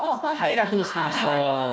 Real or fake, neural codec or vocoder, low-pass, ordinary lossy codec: fake; codec, 16 kHz, 1 kbps, FunCodec, trained on Chinese and English, 50 frames a second; none; none